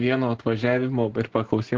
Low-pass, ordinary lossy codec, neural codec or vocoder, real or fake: 7.2 kHz; Opus, 16 kbps; none; real